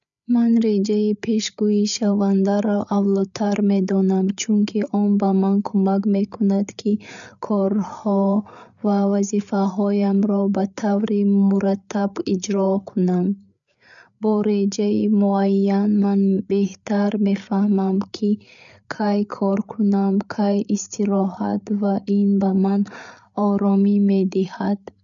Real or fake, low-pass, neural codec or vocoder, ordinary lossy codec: fake; 7.2 kHz; codec, 16 kHz, 8 kbps, FreqCodec, larger model; none